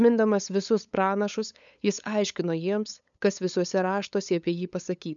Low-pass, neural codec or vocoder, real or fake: 7.2 kHz; codec, 16 kHz, 8 kbps, FunCodec, trained on LibriTTS, 25 frames a second; fake